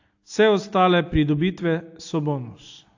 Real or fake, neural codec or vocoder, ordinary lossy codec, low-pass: real; none; AAC, 48 kbps; 7.2 kHz